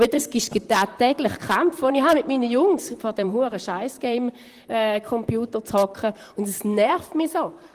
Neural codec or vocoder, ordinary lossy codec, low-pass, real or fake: vocoder, 44.1 kHz, 128 mel bands, Pupu-Vocoder; Opus, 24 kbps; 14.4 kHz; fake